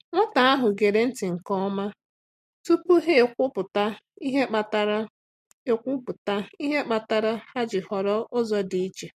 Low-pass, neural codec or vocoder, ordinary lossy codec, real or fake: 14.4 kHz; none; MP3, 64 kbps; real